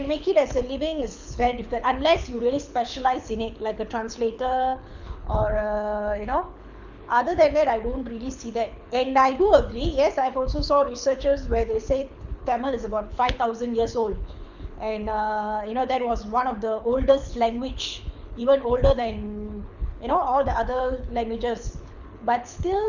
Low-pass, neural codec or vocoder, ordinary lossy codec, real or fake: 7.2 kHz; codec, 24 kHz, 6 kbps, HILCodec; none; fake